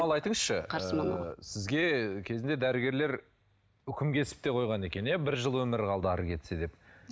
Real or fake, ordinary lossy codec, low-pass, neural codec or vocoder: real; none; none; none